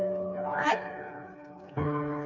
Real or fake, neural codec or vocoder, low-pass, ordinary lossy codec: fake; codec, 16 kHz, 4 kbps, FreqCodec, smaller model; 7.2 kHz; none